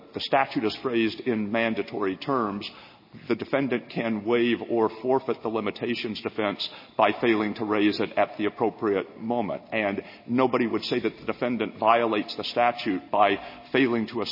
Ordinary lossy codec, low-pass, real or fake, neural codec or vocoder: MP3, 24 kbps; 5.4 kHz; real; none